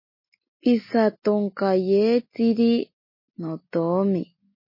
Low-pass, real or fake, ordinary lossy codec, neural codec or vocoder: 5.4 kHz; real; MP3, 24 kbps; none